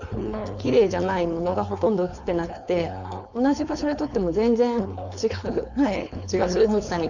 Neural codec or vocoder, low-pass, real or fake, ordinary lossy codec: codec, 16 kHz, 4.8 kbps, FACodec; 7.2 kHz; fake; none